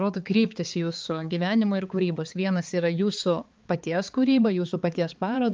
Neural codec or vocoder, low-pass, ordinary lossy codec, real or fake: codec, 16 kHz, 4 kbps, X-Codec, HuBERT features, trained on balanced general audio; 7.2 kHz; Opus, 24 kbps; fake